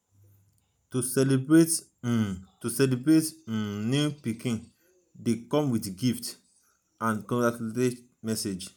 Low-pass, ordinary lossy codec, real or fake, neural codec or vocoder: none; none; real; none